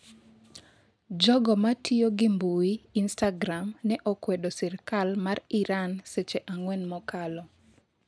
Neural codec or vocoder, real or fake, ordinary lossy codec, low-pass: none; real; none; none